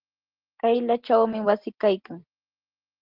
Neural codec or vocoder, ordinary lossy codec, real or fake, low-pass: none; Opus, 16 kbps; real; 5.4 kHz